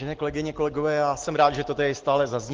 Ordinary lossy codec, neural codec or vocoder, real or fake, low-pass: Opus, 16 kbps; none; real; 7.2 kHz